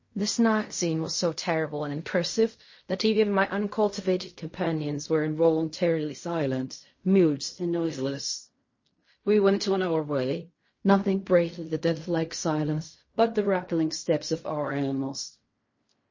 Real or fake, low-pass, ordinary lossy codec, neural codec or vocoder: fake; 7.2 kHz; MP3, 32 kbps; codec, 16 kHz in and 24 kHz out, 0.4 kbps, LongCat-Audio-Codec, fine tuned four codebook decoder